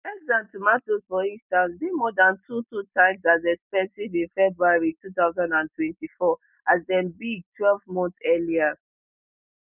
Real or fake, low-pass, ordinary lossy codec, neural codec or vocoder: real; 3.6 kHz; none; none